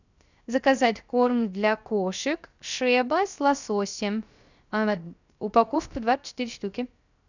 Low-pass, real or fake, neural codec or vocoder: 7.2 kHz; fake; codec, 16 kHz, 0.3 kbps, FocalCodec